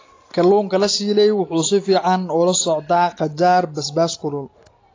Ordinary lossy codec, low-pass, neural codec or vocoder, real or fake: AAC, 32 kbps; 7.2 kHz; none; real